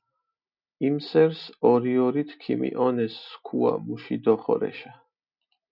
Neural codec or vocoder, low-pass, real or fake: none; 5.4 kHz; real